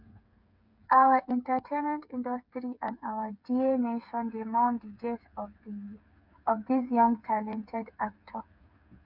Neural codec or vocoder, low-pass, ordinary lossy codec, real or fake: codec, 16 kHz, 8 kbps, FreqCodec, smaller model; 5.4 kHz; none; fake